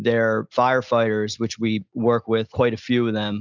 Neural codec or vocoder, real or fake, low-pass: none; real; 7.2 kHz